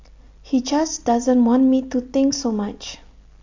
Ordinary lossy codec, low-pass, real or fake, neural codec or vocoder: AAC, 48 kbps; 7.2 kHz; real; none